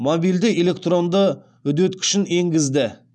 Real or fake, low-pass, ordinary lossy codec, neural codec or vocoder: real; none; none; none